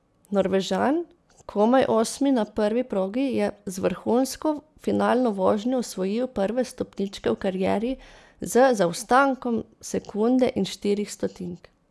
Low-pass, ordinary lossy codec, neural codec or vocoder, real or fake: none; none; none; real